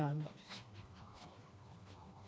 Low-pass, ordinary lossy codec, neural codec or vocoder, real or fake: none; none; codec, 16 kHz, 2 kbps, FreqCodec, smaller model; fake